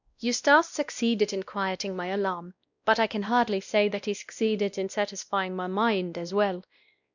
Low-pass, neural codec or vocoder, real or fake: 7.2 kHz; codec, 16 kHz, 1 kbps, X-Codec, WavLM features, trained on Multilingual LibriSpeech; fake